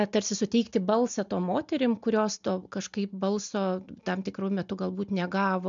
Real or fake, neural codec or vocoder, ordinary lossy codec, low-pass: real; none; MP3, 64 kbps; 7.2 kHz